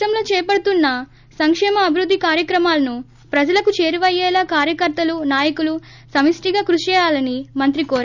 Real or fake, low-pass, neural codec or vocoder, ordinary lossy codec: real; 7.2 kHz; none; none